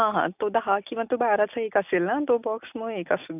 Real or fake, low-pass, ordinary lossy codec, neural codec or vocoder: real; 3.6 kHz; none; none